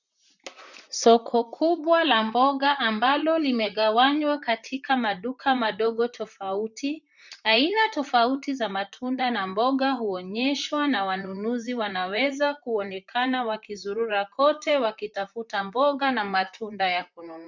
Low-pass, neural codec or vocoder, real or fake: 7.2 kHz; vocoder, 44.1 kHz, 128 mel bands, Pupu-Vocoder; fake